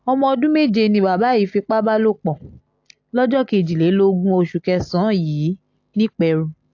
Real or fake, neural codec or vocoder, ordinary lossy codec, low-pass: real; none; AAC, 48 kbps; 7.2 kHz